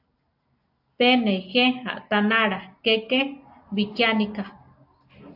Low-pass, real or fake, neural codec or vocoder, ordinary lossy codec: 5.4 kHz; real; none; AAC, 48 kbps